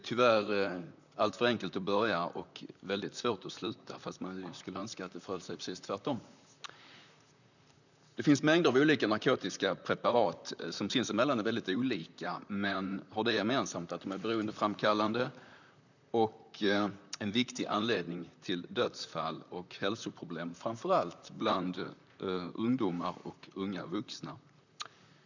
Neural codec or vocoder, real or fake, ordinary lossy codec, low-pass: vocoder, 44.1 kHz, 128 mel bands, Pupu-Vocoder; fake; none; 7.2 kHz